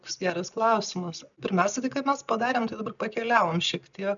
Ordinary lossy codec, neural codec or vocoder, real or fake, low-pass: MP3, 96 kbps; none; real; 7.2 kHz